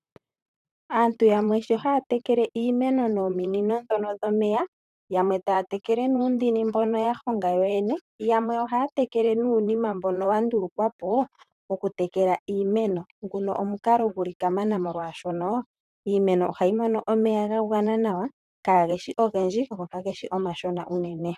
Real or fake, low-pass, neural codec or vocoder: fake; 14.4 kHz; vocoder, 44.1 kHz, 128 mel bands, Pupu-Vocoder